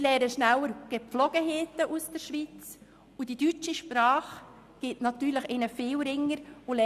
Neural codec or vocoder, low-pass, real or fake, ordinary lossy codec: none; 14.4 kHz; real; Opus, 64 kbps